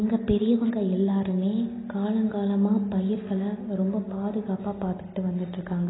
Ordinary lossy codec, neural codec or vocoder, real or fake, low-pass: AAC, 16 kbps; none; real; 7.2 kHz